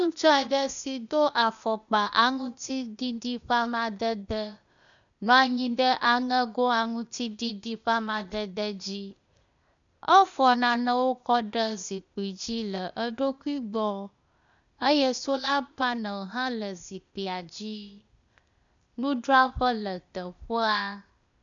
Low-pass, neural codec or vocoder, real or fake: 7.2 kHz; codec, 16 kHz, 0.8 kbps, ZipCodec; fake